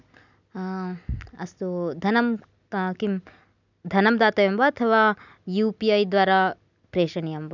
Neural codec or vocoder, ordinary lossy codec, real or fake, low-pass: none; none; real; 7.2 kHz